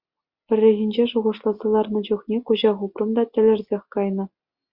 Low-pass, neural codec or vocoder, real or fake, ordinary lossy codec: 5.4 kHz; none; real; Opus, 64 kbps